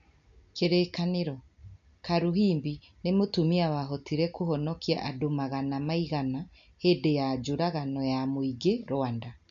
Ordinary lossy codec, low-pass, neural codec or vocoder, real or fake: none; 7.2 kHz; none; real